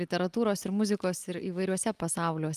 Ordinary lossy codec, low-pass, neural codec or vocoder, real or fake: Opus, 32 kbps; 14.4 kHz; none; real